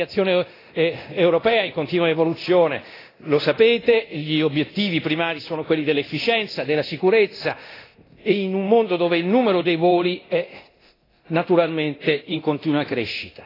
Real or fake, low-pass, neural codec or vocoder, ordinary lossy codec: fake; 5.4 kHz; codec, 24 kHz, 0.9 kbps, DualCodec; AAC, 24 kbps